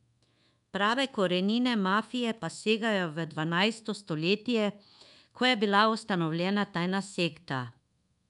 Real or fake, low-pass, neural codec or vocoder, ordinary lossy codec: fake; 10.8 kHz; codec, 24 kHz, 1.2 kbps, DualCodec; none